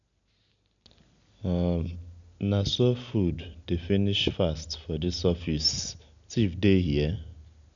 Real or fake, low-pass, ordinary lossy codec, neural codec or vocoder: real; 7.2 kHz; none; none